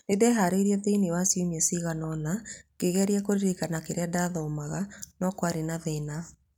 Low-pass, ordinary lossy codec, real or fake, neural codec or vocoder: 19.8 kHz; none; real; none